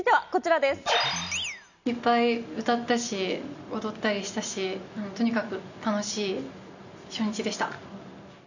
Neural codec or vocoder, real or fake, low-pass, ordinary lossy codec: none; real; 7.2 kHz; none